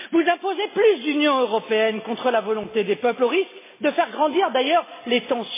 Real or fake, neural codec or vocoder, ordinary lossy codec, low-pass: real; none; MP3, 16 kbps; 3.6 kHz